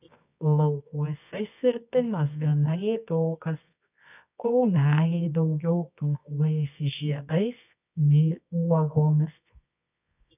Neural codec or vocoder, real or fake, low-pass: codec, 24 kHz, 0.9 kbps, WavTokenizer, medium music audio release; fake; 3.6 kHz